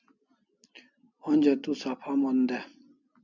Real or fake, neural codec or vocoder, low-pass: real; none; 7.2 kHz